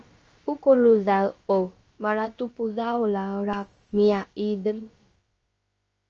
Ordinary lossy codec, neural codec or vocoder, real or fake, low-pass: Opus, 24 kbps; codec, 16 kHz, about 1 kbps, DyCAST, with the encoder's durations; fake; 7.2 kHz